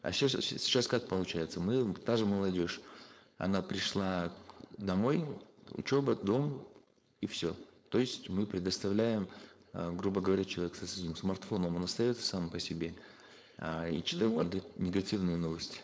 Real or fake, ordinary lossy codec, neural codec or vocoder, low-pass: fake; none; codec, 16 kHz, 4.8 kbps, FACodec; none